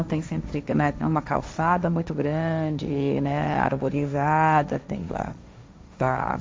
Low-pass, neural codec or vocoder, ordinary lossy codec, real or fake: none; codec, 16 kHz, 1.1 kbps, Voila-Tokenizer; none; fake